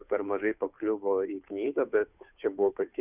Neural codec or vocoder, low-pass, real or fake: codec, 16 kHz, 2 kbps, FunCodec, trained on Chinese and English, 25 frames a second; 3.6 kHz; fake